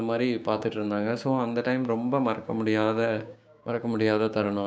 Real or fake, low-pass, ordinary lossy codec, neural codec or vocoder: fake; none; none; codec, 16 kHz, 6 kbps, DAC